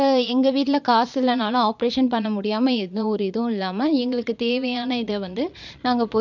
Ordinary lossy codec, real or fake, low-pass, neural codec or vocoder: none; fake; 7.2 kHz; vocoder, 22.05 kHz, 80 mel bands, Vocos